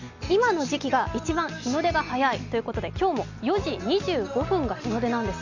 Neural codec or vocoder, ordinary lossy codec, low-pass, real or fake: none; none; 7.2 kHz; real